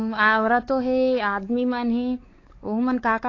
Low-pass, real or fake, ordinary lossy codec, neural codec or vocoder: 7.2 kHz; fake; AAC, 32 kbps; codec, 16 kHz, 4 kbps, X-Codec, WavLM features, trained on Multilingual LibriSpeech